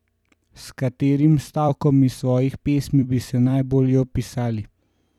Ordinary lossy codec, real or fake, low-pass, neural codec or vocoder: none; fake; 19.8 kHz; vocoder, 44.1 kHz, 128 mel bands every 256 samples, BigVGAN v2